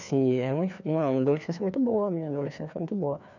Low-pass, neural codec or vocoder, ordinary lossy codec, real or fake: 7.2 kHz; codec, 16 kHz, 2 kbps, FreqCodec, larger model; none; fake